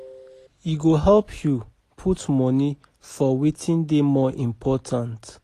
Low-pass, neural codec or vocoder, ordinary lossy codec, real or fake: 14.4 kHz; none; AAC, 48 kbps; real